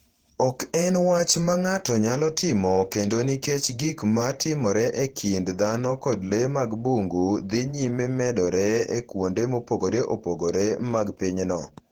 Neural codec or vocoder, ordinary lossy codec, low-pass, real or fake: vocoder, 48 kHz, 128 mel bands, Vocos; Opus, 16 kbps; 19.8 kHz; fake